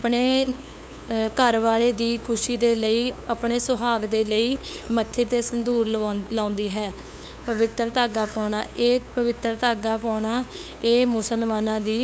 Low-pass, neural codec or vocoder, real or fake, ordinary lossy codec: none; codec, 16 kHz, 2 kbps, FunCodec, trained on LibriTTS, 25 frames a second; fake; none